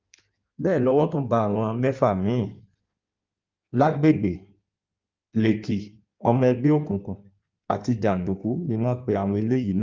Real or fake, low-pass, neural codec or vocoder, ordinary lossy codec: fake; 7.2 kHz; codec, 16 kHz in and 24 kHz out, 1.1 kbps, FireRedTTS-2 codec; Opus, 32 kbps